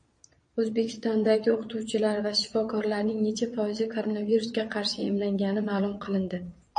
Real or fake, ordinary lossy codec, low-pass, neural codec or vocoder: fake; MP3, 48 kbps; 9.9 kHz; vocoder, 22.05 kHz, 80 mel bands, Vocos